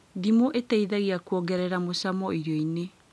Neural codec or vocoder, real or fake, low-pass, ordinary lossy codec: none; real; none; none